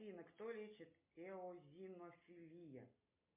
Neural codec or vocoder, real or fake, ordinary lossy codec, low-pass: none; real; MP3, 32 kbps; 3.6 kHz